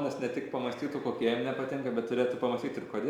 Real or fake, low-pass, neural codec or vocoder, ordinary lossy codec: real; 19.8 kHz; none; Opus, 64 kbps